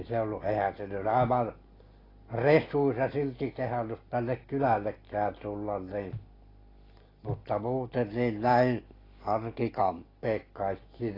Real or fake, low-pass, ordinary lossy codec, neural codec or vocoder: real; 5.4 kHz; AAC, 24 kbps; none